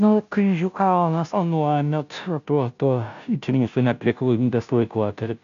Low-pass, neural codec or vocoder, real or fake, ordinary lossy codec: 7.2 kHz; codec, 16 kHz, 0.5 kbps, FunCodec, trained on Chinese and English, 25 frames a second; fake; AAC, 96 kbps